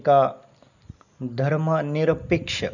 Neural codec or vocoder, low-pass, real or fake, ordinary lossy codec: none; 7.2 kHz; real; none